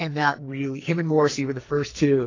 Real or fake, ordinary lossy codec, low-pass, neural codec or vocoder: fake; AAC, 32 kbps; 7.2 kHz; codec, 44.1 kHz, 2.6 kbps, SNAC